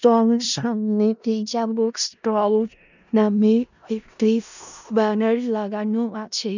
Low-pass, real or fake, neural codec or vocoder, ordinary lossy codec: 7.2 kHz; fake; codec, 16 kHz in and 24 kHz out, 0.4 kbps, LongCat-Audio-Codec, four codebook decoder; none